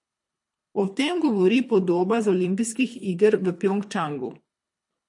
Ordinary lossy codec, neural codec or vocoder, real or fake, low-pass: MP3, 48 kbps; codec, 24 kHz, 3 kbps, HILCodec; fake; 10.8 kHz